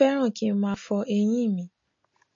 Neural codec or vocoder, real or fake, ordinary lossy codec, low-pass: none; real; MP3, 32 kbps; 7.2 kHz